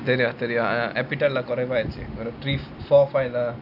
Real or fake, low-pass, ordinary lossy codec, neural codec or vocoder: real; 5.4 kHz; none; none